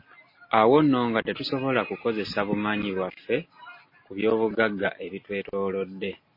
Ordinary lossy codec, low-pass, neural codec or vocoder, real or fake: MP3, 24 kbps; 5.4 kHz; none; real